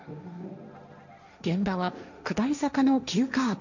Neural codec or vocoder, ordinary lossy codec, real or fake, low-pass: codec, 16 kHz, 1.1 kbps, Voila-Tokenizer; none; fake; 7.2 kHz